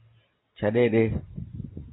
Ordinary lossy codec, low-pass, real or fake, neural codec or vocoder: AAC, 16 kbps; 7.2 kHz; real; none